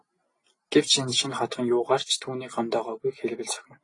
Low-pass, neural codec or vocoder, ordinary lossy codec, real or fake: 10.8 kHz; none; AAC, 32 kbps; real